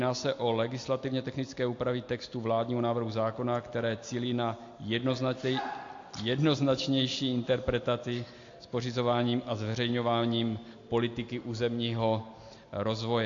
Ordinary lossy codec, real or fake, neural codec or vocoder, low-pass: AAC, 48 kbps; real; none; 7.2 kHz